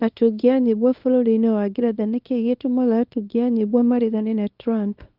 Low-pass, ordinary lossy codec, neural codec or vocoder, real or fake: 5.4 kHz; Opus, 24 kbps; codec, 24 kHz, 0.9 kbps, WavTokenizer, small release; fake